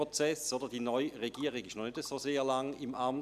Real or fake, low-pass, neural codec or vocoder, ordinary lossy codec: real; 14.4 kHz; none; none